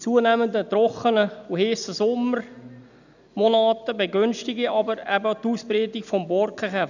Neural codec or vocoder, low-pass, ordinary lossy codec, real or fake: none; 7.2 kHz; none; real